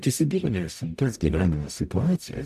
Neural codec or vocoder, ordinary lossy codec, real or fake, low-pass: codec, 44.1 kHz, 0.9 kbps, DAC; AAC, 96 kbps; fake; 14.4 kHz